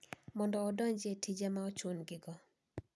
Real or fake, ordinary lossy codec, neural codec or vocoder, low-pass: real; none; none; none